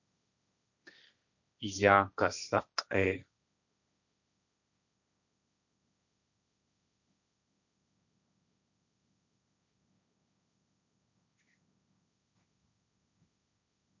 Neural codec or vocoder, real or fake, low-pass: codec, 16 kHz, 1.1 kbps, Voila-Tokenizer; fake; 7.2 kHz